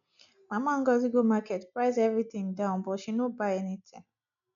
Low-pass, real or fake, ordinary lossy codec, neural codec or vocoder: 7.2 kHz; real; none; none